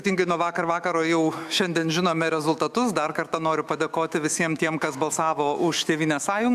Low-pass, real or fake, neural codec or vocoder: 14.4 kHz; fake; autoencoder, 48 kHz, 128 numbers a frame, DAC-VAE, trained on Japanese speech